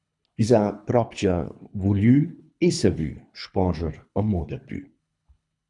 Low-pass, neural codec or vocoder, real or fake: 10.8 kHz; codec, 24 kHz, 3 kbps, HILCodec; fake